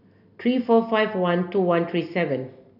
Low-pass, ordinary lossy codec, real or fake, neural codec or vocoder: 5.4 kHz; none; real; none